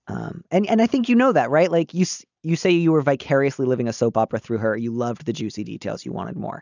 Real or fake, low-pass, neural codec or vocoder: real; 7.2 kHz; none